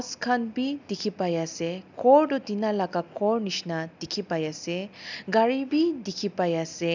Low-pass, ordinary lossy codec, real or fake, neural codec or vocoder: 7.2 kHz; none; real; none